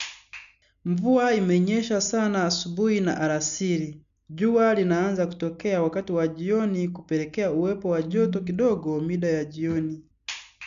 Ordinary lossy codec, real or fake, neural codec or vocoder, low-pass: none; real; none; 7.2 kHz